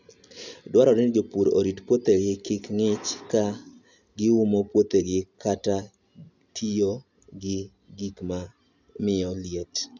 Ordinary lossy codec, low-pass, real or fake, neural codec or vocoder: none; 7.2 kHz; real; none